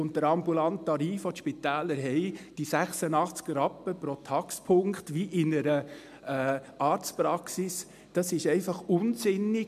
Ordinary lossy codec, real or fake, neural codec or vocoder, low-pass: MP3, 96 kbps; real; none; 14.4 kHz